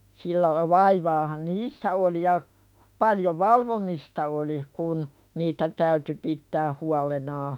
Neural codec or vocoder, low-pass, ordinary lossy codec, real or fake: autoencoder, 48 kHz, 32 numbers a frame, DAC-VAE, trained on Japanese speech; 19.8 kHz; none; fake